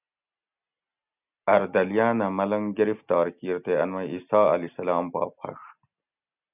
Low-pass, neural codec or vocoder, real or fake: 3.6 kHz; none; real